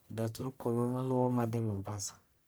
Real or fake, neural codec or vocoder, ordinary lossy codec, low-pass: fake; codec, 44.1 kHz, 1.7 kbps, Pupu-Codec; none; none